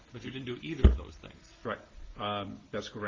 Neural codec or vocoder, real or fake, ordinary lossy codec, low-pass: none; real; Opus, 16 kbps; 7.2 kHz